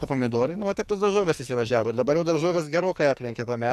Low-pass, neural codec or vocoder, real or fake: 14.4 kHz; codec, 44.1 kHz, 2.6 kbps, SNAC; fake